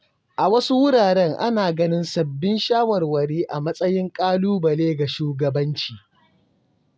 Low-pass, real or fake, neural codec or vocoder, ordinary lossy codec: none; real; none; none